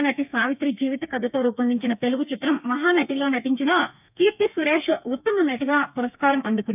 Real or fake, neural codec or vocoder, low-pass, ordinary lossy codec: fake; codec, 32 kHz, 1.9 kbps, SNAC; 3.6 kHz; none